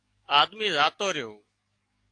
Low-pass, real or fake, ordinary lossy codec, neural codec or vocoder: 9.9 kHz; fake; AAC, 48 kbps; codec, 44.1 kHz, 7.8 kbps, DAC